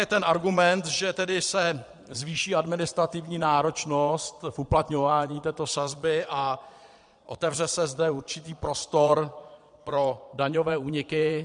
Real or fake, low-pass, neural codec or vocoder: fake; 9.9 kHz; vocoder, 22.05 kHz, 80 mel bands, Vocos